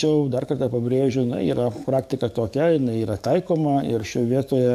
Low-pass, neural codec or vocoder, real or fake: 14.4 kHz; codec, 44.1 kHz, 7.8 kbps, DAC; fake